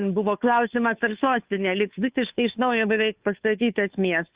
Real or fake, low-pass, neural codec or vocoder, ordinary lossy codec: fake; 3.6 kHz; codec, 16 kHz, 2 kbps, FunCodec, trained on Chinese and English, 25 frames a second; Opus, 64 kbps